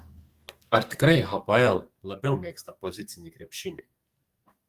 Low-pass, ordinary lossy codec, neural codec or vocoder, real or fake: 14.4 kHz; Opus, 32 kbps; codec, 44.1 kHz, 2.6 kbps, SNAC; fake